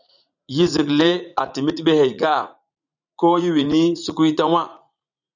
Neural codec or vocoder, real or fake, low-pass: vocoder, 44.1 kHz, 80 mel bands, Vocos; fake; 7.2 kHz